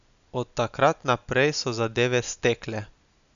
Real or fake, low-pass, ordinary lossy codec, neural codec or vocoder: real; 7.2 kHz; none; none